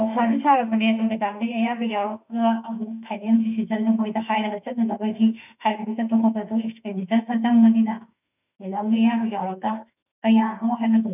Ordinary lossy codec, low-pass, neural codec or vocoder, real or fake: none; 3.6 kHz; autoencoder, 48 kHz, 32 numbers a frame, DAC-VAE, trained on Japanese speech; fake